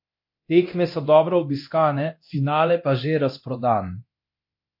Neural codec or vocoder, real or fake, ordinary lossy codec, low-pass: codec, 24 kHz, 0.9 kbps, DualCodec; fake; MP3, 32 kbps; 5.4 kHz